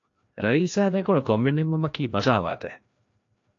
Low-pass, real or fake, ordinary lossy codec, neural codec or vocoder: 7.2 kHz; fake; AAC, 48 kbps; codec, 16 kHz, 1 kbps, FreqCodec, larger model